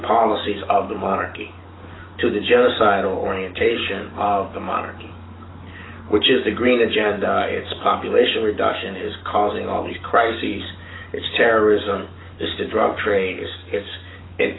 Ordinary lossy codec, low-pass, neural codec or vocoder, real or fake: AAC, 16 kbps; 7.2 kHz; codec, 44.1 kHz, 7.8 kbps, DAC; fake